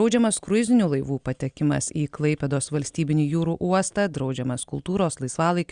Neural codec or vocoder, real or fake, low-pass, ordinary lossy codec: none; real; 9.9 kHz; Opus, 64 kbps